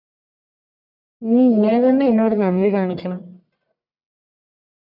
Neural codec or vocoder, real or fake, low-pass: codec, 44.1 kHz, 1.7 kbps, Pupu-Codec; fake; 5.4 kHz